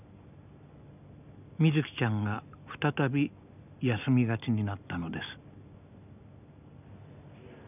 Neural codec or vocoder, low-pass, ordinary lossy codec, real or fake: none; 3.6 kHz; none; real